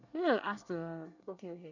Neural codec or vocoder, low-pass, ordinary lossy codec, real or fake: codec, 24 kHz, 1 kbps, SNAC; 7.2 kHz; none; fake